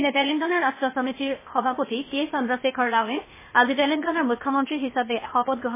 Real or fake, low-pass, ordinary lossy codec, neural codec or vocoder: fake; 3.6 kHz; MP3, 16 kbps; codec, 16 kHz, 0.8 kbps, ZipCodec